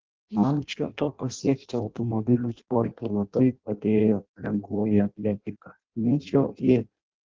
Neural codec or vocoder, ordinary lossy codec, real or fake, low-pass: codec, 16 kHz in and 24 kHz out, 0.6 kbps, FireRedTTS-2 codec; Opus, 16 kbps; fake; 7.2 kHz